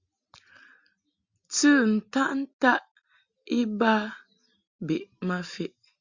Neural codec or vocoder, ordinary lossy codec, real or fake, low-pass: none; Opus, 64 kbps; real; 7.2 kHz